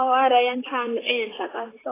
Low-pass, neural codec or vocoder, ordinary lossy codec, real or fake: 3.6 kHz; vocoder, 44.1 kHz, 128 mel bands, Pupu-Vocoder; AAC, 16 kbps; fake